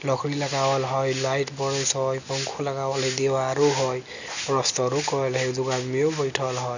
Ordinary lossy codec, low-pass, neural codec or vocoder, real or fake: none; 7.2 kHz; none; real